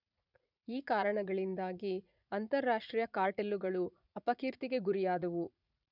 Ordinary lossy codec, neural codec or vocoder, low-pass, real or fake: none; vocoder, 44.1 kHz, 80 mel bands, Vocos; 5.4 kHz; fake